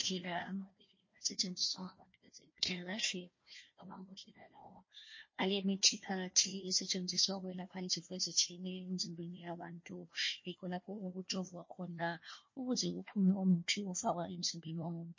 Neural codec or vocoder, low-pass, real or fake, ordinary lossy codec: codec, 16 kHz, 1 kbps, FunCodec, trained on Chinese and English, 50 frames a second; 7.2 kHz; fake; MP3, 32 kbps